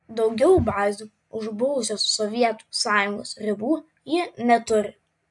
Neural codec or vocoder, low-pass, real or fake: none; 10.8 kHz; real